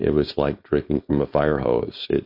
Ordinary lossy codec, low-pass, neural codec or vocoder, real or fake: MP3, 32 kbps; 5.4 kHz; codec, 16 kHz, 4.8 kbps, FACodec; fake